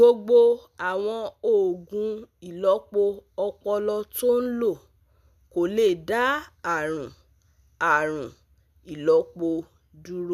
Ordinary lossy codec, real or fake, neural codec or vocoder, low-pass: none; real; none; 14.4 kHz